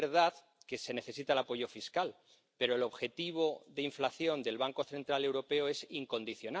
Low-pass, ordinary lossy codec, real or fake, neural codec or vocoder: none; none; real; none